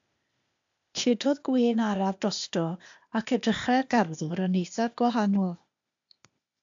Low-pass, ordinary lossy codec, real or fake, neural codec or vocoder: 7.2 kHz; AAC, 64 kbps; fake; codec, 16 kHz, 0.8 kbps, ZipCodec